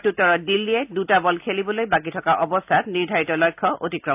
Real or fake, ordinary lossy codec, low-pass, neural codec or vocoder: real; AAC, 32 kbps; 3.6 kHz; none